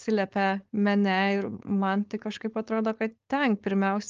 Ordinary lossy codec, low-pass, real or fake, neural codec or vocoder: Opus, 24 kbps; 7.2 kHz; fake; codec, 16 kHz, 4.8 kbps, FACodec